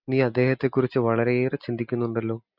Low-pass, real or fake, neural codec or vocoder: 5.4 kHz; real; none